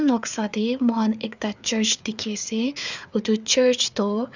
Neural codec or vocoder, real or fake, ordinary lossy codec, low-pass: codec, 16 kHz, 4 kbps, FreqCodec, larger model; fake; none; 7.2 kHz